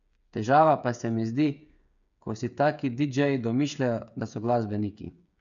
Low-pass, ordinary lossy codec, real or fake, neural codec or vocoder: 7.2 kHz; none; fake; codec, 16 kHz, 8 kbps, FreqCodec, smaller model